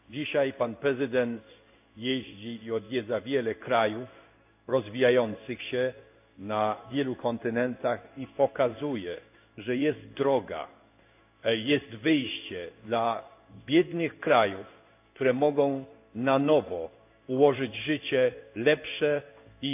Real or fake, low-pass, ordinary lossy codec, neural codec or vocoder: fake; 3.6 kHz; AAC, 32 kbps; codec, 16 kHz in and 24 kHz out, 1 kbps, XY-Tokenizer